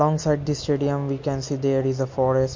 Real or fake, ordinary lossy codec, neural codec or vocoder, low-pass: real; MP3, 48 kbps; none; 7.2 kHz